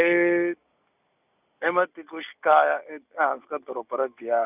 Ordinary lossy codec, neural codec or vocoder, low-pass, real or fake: none; none; 3.6 kHz; real